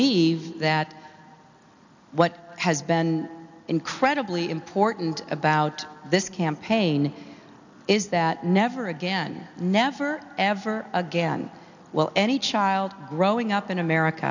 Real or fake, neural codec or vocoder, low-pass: real; none; 7.2 kHz